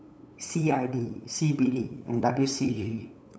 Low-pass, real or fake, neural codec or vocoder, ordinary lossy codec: none; fake; codec, 16 kHz, 8 kbps, FunCodec, trained on LibriTTS, 25 frames a second; none